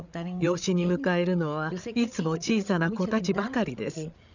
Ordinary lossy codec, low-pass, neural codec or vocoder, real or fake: none; 7.2 kHz; codec, 16 kHz, 8 kbps, FreqCodec, larger model; fake